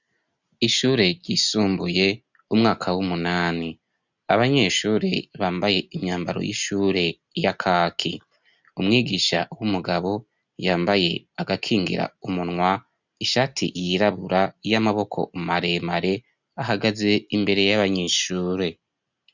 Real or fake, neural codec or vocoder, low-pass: real; none; 7.2 kHz